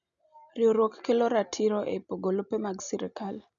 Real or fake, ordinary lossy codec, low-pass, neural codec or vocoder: real; none; 7.2 kHz; none